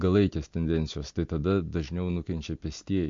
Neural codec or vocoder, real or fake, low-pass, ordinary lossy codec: none; real; 7.2 kHz; MP3, 48 kbps